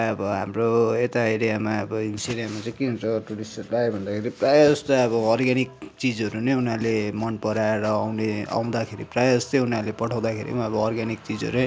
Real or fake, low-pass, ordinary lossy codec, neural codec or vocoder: real; none; none; none